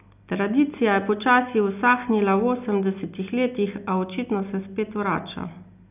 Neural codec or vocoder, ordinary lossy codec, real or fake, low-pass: none; none; real; 3.6 kHz